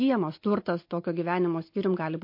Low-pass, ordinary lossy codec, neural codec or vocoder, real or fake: 5.4 kHz; MP3, 32 kbps; none; real